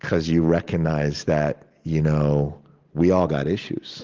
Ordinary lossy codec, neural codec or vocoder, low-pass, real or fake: Opus, 16 kbps; none; 7.2 kHz; real